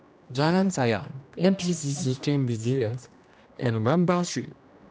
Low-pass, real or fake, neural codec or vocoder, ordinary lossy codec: none; fake; codec, 16 kHz, 1 kbps, X-Codec, HuBERT features, trained on general audio; none